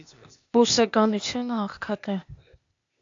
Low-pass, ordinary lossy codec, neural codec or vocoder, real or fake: 7.2 kHz; AAC, 64 kbps; codec, 16 kHz, 0.8 kbps, ZipCodec; fake